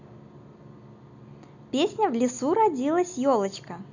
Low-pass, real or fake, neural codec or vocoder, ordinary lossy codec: 7.2 kHz; real; none; none